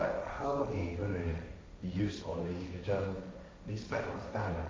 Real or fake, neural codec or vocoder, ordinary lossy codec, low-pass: fake; codec, 16 kHz, 1.1 kbps, Voila-Tokenizer; none; none